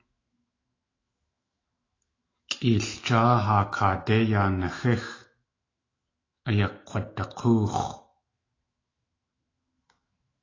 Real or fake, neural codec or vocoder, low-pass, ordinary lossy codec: fake; autoencoder, 48 kHz, 128 numbers a frame, DAC-VAE, trained on Japanese speech; 7.2 kHz; AAC, 32 kbps